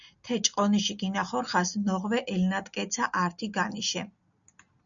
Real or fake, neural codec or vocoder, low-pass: real; none; 7.2 kHz